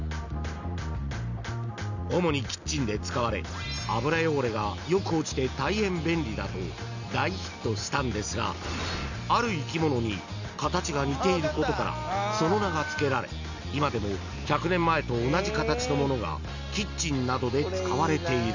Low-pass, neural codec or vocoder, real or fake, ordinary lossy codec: 7.2 kHz; none; real; none